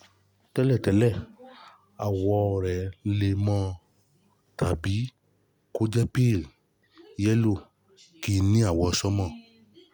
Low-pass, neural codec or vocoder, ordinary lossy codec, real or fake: none; none; none; real